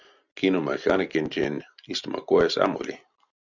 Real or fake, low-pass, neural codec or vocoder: real; 7.2 kHz; none